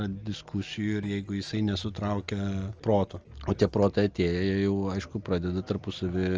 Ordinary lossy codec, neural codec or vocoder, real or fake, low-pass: Opus, 24 kbps; none; real; 7.2 kHz